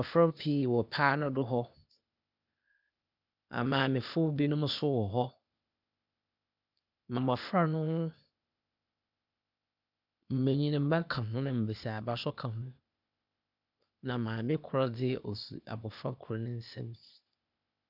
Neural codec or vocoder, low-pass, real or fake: codec, 16 kHz, 0.8 kbps, ZipCodec; 5.4 kHz; fake